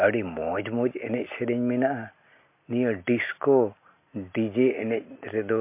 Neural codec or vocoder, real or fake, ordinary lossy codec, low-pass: none; real; MP3, 24 kbps; 3.6 kHz